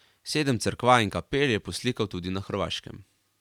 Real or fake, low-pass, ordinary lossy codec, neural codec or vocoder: fake; 19.8 kHz; none; vocoder, 44.1 kHz, 128 mel bands every 512 samples, BigVGAN v2